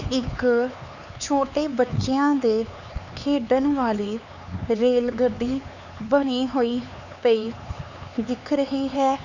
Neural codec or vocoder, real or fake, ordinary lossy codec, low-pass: codec, 16 kHz, 4 kbps, X-Codec, HuBERT features, trained on LibriSpeech; fake; none; 7.2 kHz